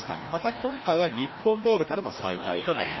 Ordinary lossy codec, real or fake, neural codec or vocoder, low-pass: MP3, 24 kbps; fake; codec, 16 kHz, 1 kbps, FreqCodec, larger model; 7.2 kHz